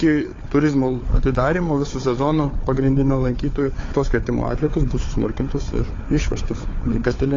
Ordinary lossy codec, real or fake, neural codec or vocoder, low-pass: AAC, 32 kbps; fake; codec, 16 kHz, 4 kbps, FunCodec, trained on Chinese and English, 50 frames a second; 7.2 kHz